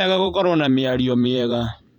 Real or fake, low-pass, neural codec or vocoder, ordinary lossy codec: fake; 19.8 kHz; vocoder, 44.1 kHz, 128 mel bands every 512 samples, BigVGAN v2; none